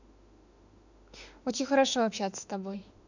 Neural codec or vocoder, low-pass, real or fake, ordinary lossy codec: autoencoder, 48 kHz, 32 numbers a frame, DAC-VAE, trained on Japanese speech; 7.2 kHz; fake; none